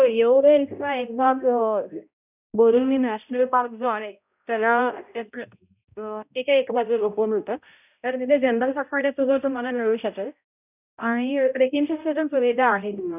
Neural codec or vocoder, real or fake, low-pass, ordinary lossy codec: codec, 16 kHz, 0.5 kbps, X-Codec, HuBERT features, trained on balanced general audio; fake; 3.6 kHz; none